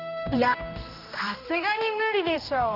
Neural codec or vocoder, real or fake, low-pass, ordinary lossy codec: codec, 16 kHz, 1 kbps, X-Codec, HuBERT features, trained on general audio; fake; 5.4 kHz; Opus, 32 kbps